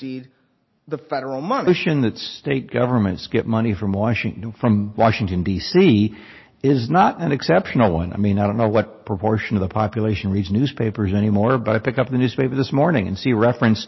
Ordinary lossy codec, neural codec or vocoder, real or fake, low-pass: MP3, 24 kbps; none; real; 7.2 kHz